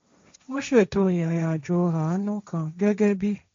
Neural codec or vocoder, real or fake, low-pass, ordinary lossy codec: codec, 16 kHz, 1.1 kbps, Voila-Tokenizer; fake; 7.2 kHz; MP3, 48 kbps